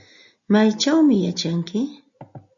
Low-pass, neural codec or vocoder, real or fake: 7.2 kHz; none; real